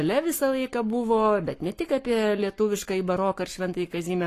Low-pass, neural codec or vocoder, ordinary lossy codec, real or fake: 14.4 kHz; codec, 44.1 kHz, 7.8 kbps, Pupu-Codec; AAC, 48 kbps; fake